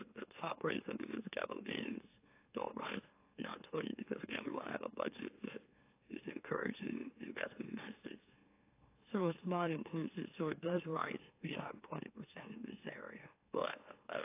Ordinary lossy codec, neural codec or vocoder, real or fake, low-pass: AAC, 24 kbps; autoencoder, 44.1 kHz, a latent of 192 numbers a frame, MeloTTS; fake; 3.6 kHz